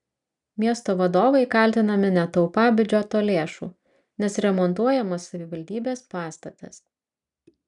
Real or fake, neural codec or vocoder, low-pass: real; none; 10.8 kHz